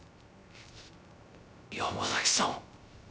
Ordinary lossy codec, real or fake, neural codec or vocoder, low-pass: none; fake; codec, 16 kHz, 0.3 kbps, FocalCodec; none